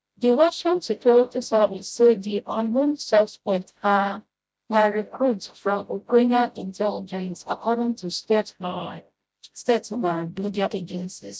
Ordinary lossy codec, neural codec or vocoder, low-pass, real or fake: none; codec, 16 kHz, 0.5 kbps, FreqCodec, smaller model; none; fake